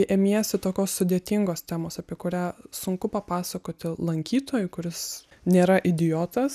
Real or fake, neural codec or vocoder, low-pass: real; none; 14.4 kHz